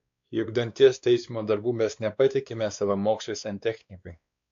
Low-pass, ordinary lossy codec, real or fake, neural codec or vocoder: 7.2 kHz; AAC, 64 kbps; fake; codec, 16 kHz, 2 kbps, X-Codec, WavLM features, trained on Multilingual LibriSpeech